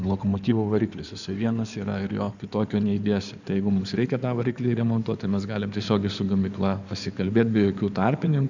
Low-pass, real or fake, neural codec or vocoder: 7.2 kHz; fake; codec, 16 kHz in and 24 kHz out, 2.2 kbps, FireRedTTS-2 codec